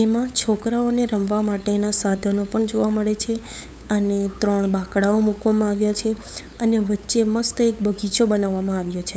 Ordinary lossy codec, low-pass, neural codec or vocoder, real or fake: none; none; codec, 16 kHz, 8 kbps, FunCodec, trained on LibriTTS, 25 frames a second; fake